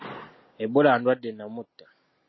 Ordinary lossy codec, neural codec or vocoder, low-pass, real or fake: MP3, 24 kbps; none; 7.2 kHz; real